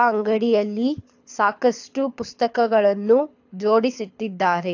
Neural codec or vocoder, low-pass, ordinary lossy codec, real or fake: codec, 24 kHz, 6 kbps, HILCodec; 7.2 kHz; AAC, 48 kbps; fake